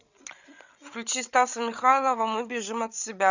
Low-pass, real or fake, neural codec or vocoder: 7.2 kHz; fake; codec, 16 kHz, 8 kbps, FreqCodec, larger model